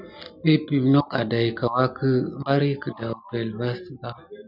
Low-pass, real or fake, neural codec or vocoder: 5.4 kHz; real; none